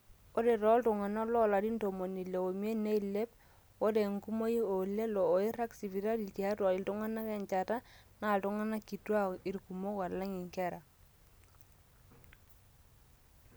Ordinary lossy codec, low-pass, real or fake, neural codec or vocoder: none; none; real; none